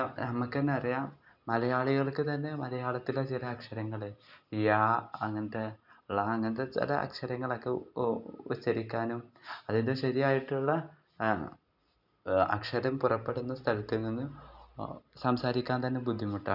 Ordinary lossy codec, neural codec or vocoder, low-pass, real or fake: none; none; 5.4 kHz; real